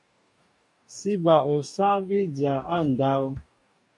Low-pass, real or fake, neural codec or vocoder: 10.8 kHz; fake; codec, 44.1 kHz, 2.6 kbps, DAC